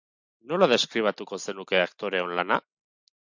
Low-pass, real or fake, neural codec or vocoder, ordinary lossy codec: 7.2 kHz; real; none; MP3, 64 kbps